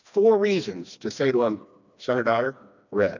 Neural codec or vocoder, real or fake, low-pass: codec, 16 kHz, 2 kbps, FreqCodec, smaller model; fake; 7.2 kHz